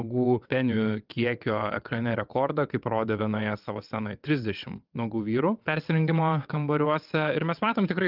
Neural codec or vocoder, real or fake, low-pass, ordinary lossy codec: vocoder, 22.05 kHz, 80 mel bands, WaveNeXt; fake; 5.4 kHz; Opus, 32 kbps